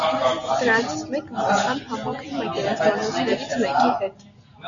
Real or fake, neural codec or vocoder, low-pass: real; none; 7.2 kHz